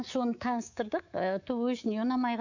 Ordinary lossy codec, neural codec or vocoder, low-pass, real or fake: none; codec, 24 kHz, 3.1 kbps, DualCodec; 7.2 kHz; fake